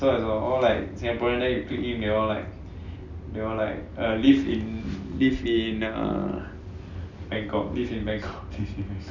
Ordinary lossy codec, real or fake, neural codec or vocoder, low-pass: none; real; none; 7.2 kHz